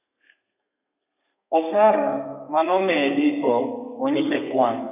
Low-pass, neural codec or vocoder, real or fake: 3.6 kHz; codec, 32 kHz, 1.9 kbps, SNAC; fake